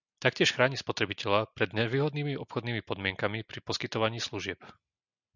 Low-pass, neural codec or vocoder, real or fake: 7.2 kHz; none; real